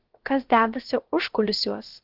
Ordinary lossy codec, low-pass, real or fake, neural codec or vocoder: Opus, 16 kbps; 5.4 kHz; fake; codec, 16 kHz, about 1 kbps, DyCAST, with the encoder's durations